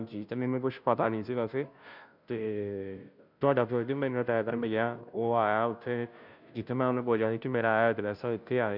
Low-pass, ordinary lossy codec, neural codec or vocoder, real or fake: 5.4 kHz; none; codec, 16 kHz, 0.5 kbps, FunCodec, trained on Chinese and English, 25 frames a second; fake